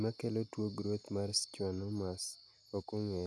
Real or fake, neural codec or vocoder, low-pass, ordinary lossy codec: real; none; none; none